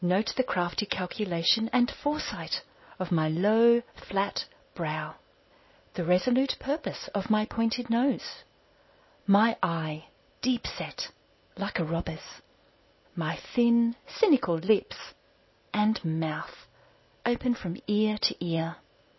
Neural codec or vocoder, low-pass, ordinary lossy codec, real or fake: none; 7.2 kHz; MP3, 24 kbps; real